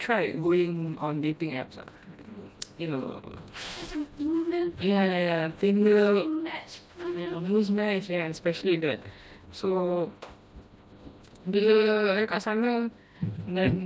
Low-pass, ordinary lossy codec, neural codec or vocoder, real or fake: none; none; codec, 16 kHz, 1 kbps, FreqCodec, smaller model; fake